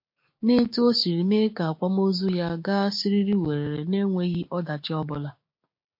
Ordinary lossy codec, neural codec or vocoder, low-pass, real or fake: MP3, 32 kbps; codec, 16 kHz, 6 kbps, DAC; 5.4 kHz; fake